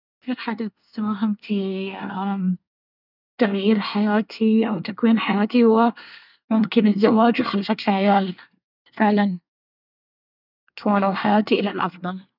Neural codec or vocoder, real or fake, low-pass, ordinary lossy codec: codec, 24 kHz, 1 kbps, SNAC; fake; 5.4 kHz; none